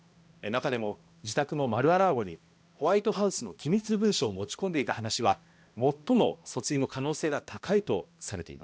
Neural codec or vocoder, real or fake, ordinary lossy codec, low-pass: codec, 16 kHz, 1 kbps, X-Codec, HuBERT features, trained on balanced general audio; fake; none; none